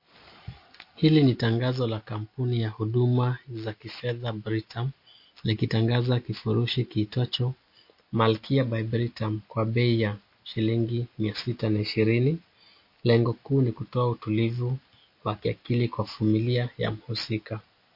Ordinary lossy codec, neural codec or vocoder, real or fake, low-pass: MP3, 32 kbps; none; real; 5.4 kHz